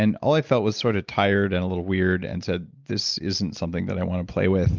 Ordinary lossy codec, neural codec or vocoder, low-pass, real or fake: Opus, 24 kbps; none; 7.2 kHz; real